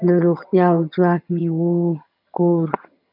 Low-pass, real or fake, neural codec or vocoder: 5.4 kHz; fake; vocoder, 22.05 kHz, 80 mel bands, HiFi-GAN